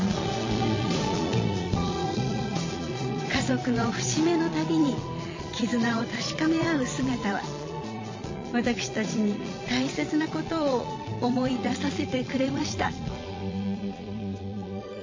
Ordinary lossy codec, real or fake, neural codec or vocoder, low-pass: MP3, 32 kbps; fake; vocoder, 22.05 kHz, 80 mel bands, Vocos; 7.2 kHz